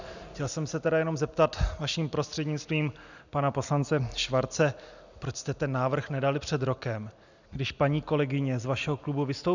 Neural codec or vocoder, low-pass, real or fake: none; 7.2 kHz; real